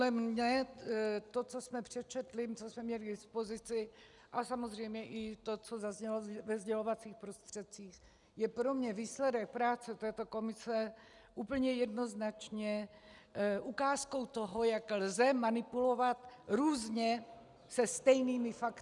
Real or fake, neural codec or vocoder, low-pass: real; none; 10.8 kHz